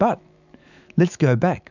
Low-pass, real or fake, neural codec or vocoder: 7.2 kHz; fake; autoencoder, 48 kHz, 128 numbers a frame, DAC-VAE, trained on Japanese speech